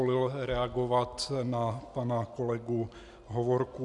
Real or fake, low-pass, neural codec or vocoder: real; 10.8 kHz; none